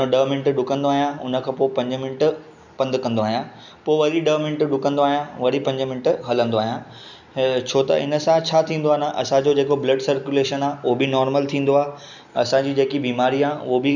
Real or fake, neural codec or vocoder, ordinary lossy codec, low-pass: real; none; none; 7.2 kHz